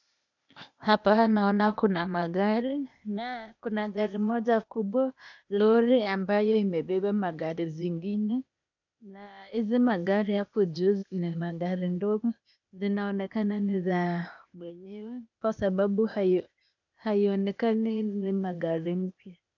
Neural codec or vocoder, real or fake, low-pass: codec, 16 kHz, 0.8 kbps, ZipCodec; fake; 7.2 kHz